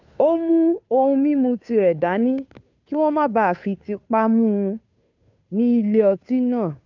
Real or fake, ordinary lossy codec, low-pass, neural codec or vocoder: fake; none; 7.2 kHz; codec, 16 kHz, 2 kbps, FunCodec, trained on Chinese and English, 25 frames a second